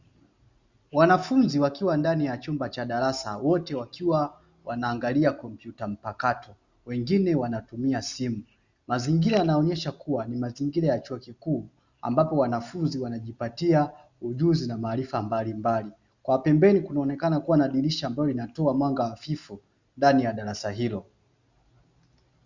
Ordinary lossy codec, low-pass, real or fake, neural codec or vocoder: Opus, 64 kbps; 7.2 kHz; real; none